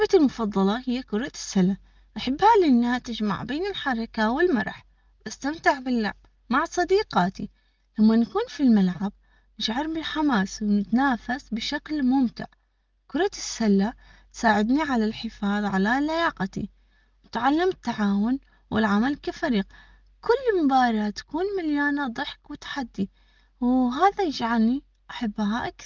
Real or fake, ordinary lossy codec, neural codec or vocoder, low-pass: real; Opus, 32 kbps; none; 7.2 kHz